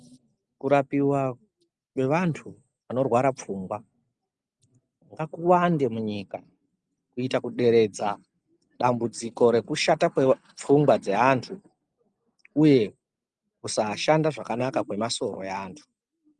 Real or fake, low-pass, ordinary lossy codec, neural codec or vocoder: real; 10.8 kHz; Opus, 32 kbps; none